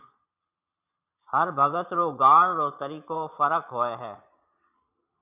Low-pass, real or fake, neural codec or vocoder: 3.6 kHz; fake; vocoder, 22.05 kHz, 80 mel bands, Vocos